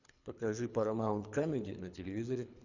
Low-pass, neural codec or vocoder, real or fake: 7.2 kHz; codec, 24 kHz, 3 kbps, HILCodec; fake